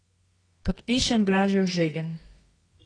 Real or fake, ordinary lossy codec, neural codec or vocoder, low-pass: fake; AAC, 32 kbps; codec, 24 kHz, 0.9 kbps, WavTokenizer, medium music audio release; 9.9 kHz